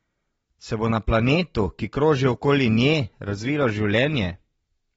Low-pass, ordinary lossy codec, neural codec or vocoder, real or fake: 9.9 kHz; AAC, 24 kbps; none; real